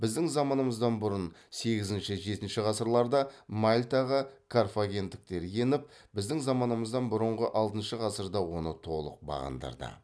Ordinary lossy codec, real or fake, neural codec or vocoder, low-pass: none; real; none; none